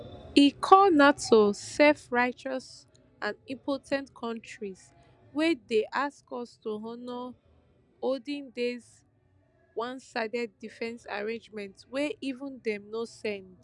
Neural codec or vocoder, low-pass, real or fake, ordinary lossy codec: none; 10.8 kHz; real; none